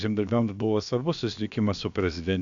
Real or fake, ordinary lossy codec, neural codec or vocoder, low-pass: fake; AAC, 64 kbps; codec, 16 kHz, about 1 kbps, DyCAST, with the encoder's durations; 7.2 kHz